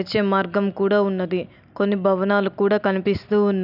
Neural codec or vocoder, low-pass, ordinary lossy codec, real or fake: none; 5.4 kHz; none; real